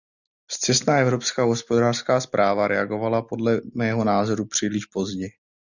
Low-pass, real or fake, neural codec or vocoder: 7.2 kHz; real; none